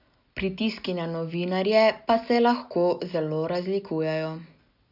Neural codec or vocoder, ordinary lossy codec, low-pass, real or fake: none; none; 5.4 kHz; real